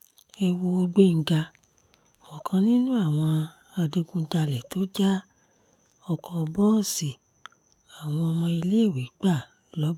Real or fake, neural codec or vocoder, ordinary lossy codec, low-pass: fake; codec, 44.1 kHz, 7.8 kbps, DAC; none; 19.8 kHz